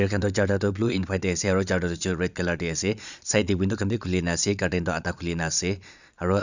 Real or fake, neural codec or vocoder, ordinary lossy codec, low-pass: fake; vocoder, 22.05 kHz, 80 mel bands, Vocos; none; 7.2 kHz